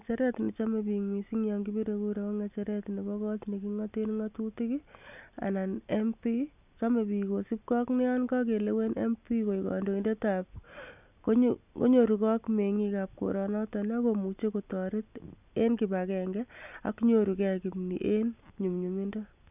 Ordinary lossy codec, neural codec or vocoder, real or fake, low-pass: none; none; real; 3.6 kHz